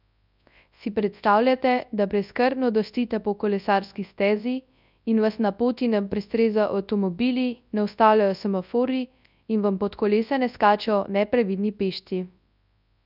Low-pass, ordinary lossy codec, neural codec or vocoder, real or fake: 5.4 kHz; none; codec, 24 kHz, 0.9 kbps, WavTokenizer, large speech release; fake